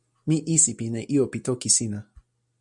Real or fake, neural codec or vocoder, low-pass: real; none; 10.8 kHz